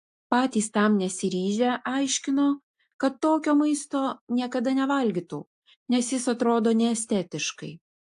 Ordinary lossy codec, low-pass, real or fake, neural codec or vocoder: AAC, 64 kbps; 10.8 kHz; real; none